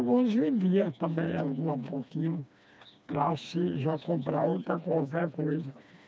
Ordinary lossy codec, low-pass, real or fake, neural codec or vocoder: none; none; fake; codec, 16 kHz, 2 kbps, FreqCodec, smaller model